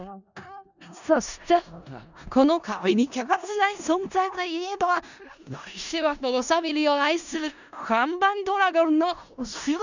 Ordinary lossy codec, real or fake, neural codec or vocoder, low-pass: none; fake; codec, 16 kHz in and 24 kHz out, 0.4 kbps, LongCat-Audio-Codec, four codebook decoder; 7.2 kHz